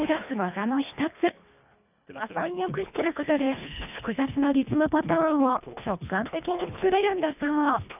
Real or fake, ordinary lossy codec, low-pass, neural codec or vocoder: fake; none; 3.6 kHz; codec, 24 kHz, 1.5 kbps, HILCodec